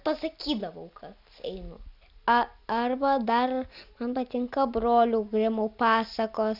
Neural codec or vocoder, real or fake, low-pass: none; real; 5.4 kHz